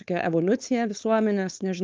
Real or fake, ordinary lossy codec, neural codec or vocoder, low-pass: fake; Opus, 32 kbps; codec, 16 kHz, 4.8 kbps, FACodec; 7.2 kHz